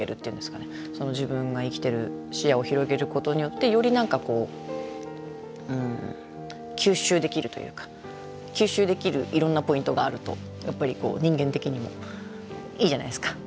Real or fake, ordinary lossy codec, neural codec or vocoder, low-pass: real; none; none; none